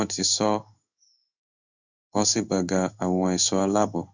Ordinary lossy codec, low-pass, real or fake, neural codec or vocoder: none; 7.2 kHz; fake; codec, 16 kHz in and 24 kHz out, 1 kbps, XY-Tokenizer